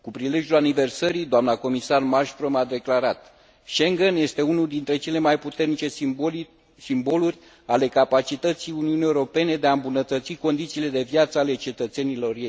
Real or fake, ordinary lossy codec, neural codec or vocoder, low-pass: real; none; none; none